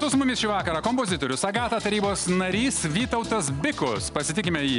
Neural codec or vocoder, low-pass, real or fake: none; 10.8 kHz; real